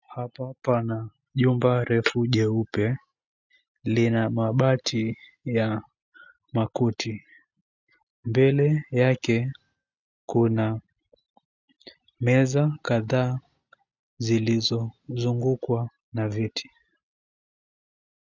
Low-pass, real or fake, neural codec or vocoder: 7.2 kHz; real; none